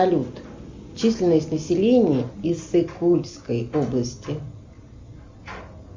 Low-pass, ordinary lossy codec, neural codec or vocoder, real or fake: 7.2 kHz; MP3, 64 kbps; none; real